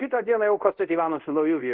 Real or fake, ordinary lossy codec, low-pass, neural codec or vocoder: fake; Opus, 32 kbps; 5.4 kHz; codec, 24 kHz, 0.5 kbps, DualCodec